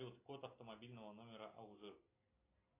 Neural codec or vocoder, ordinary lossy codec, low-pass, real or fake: none; AAC, 24 kbps; 3.6 kHz; real